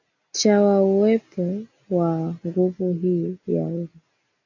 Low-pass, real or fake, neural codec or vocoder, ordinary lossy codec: 7.2 kHz; real; none; AAC, 48 kbps